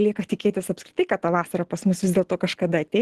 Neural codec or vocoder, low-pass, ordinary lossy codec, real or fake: none; 14.4 kHz; Opus, 16 kbps; real